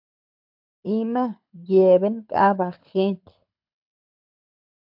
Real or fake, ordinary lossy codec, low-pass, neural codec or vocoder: fake; AAC, 48 kbps; 5.4 kHz; codec, 24 kHz, 6 kbps, HILCodec